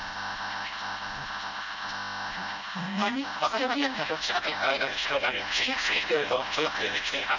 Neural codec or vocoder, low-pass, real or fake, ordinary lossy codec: codec, 16 kHz, 0.5 kbps, FreqCodec, smaller model; 7.2 kHz; fake; none